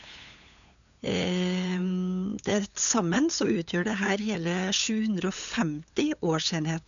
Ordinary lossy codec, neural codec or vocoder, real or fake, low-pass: none; codec, 16 kHz, 8 kbps, FunCodec, trained on LibriTTS, 25 frames a second; fake; 7.2 kHz